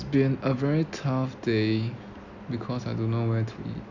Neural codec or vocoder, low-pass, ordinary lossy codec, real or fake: none; 7.2 kHz; none; real